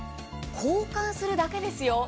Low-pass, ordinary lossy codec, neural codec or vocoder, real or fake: none; none; none; real